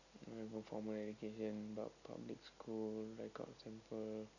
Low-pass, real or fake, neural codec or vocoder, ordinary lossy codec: 7.2 kHz; real; none; none